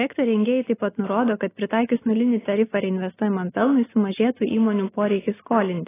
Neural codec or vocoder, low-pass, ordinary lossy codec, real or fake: none; 3.6 kHz; AAC, 16 kbps; real